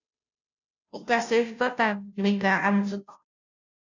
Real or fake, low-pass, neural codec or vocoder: fake; 7.2 kHz; codec, 16 kHz, 0.5 kbps, FunCodec, trained on Chinese and English, 25 frames a second